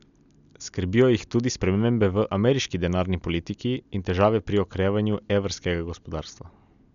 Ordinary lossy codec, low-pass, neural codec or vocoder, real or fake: none; 7.2 kHz; none; real